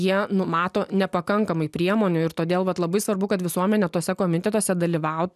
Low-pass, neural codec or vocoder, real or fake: 14.4 kHz; none; real